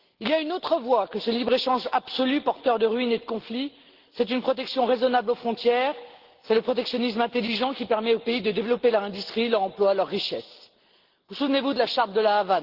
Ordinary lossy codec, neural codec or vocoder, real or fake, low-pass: Opus, 16 kbps; none; real; 5.4 kHz